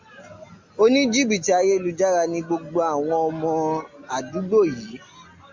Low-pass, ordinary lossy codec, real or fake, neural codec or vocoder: 7.2 kHz; MP3, 64 kbps; real; none